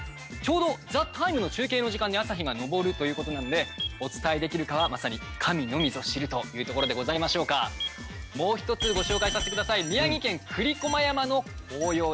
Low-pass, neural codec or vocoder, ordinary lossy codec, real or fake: none; none; none; real